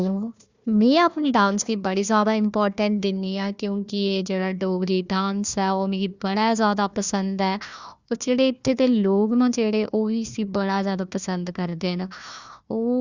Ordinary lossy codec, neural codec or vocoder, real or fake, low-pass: Opus, 64 kbps; codec, 16 kHz, 1 kbps, FunCodec, trained on Chinese and English, 50 frames a second; fake; 7.2 kHz